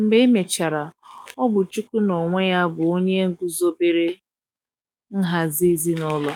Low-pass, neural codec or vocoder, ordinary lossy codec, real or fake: 19.8 kHz; codec, 44.1 kHz, 7.8 kbps, DAC; none; fake